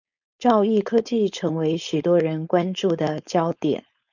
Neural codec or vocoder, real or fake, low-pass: codec, 16 kHz, 4.8 kbps, FACodec; fake; 7.2 kHz